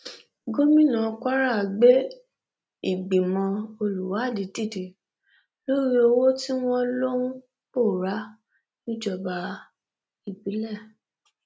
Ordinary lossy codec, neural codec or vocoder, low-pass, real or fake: none; none; none; real